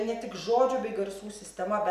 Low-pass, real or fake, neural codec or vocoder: 14.4 kHz; real; none